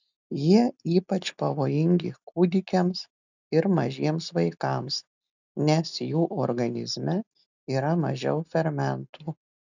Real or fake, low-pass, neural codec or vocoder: real; 7.2 kHz; none